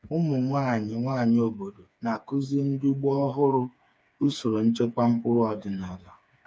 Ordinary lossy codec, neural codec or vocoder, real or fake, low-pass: none; codec, 16 kHz, 4 kbps, FreqCodec, smaller model; fake; none